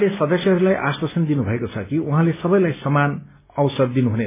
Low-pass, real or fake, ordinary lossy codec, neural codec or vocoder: 3.6 kHz; real; MP3, 16 kbps; none